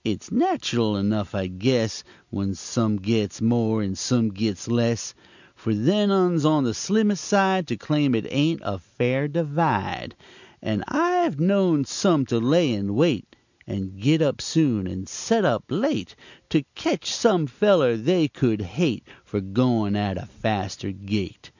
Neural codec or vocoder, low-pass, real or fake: none; 7.2 kHz; real